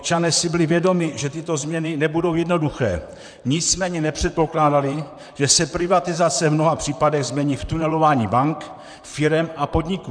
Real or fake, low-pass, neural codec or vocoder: fake; 9.9 kHz; vocoder, 44.1 kHz, 128 mel bands, Pupu-Vocoder